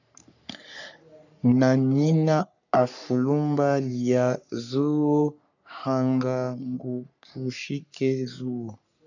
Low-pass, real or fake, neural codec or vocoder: 7.2 kHz; fake; codec, 44.1 kHz, 3.4 kbps, Pupu-Codec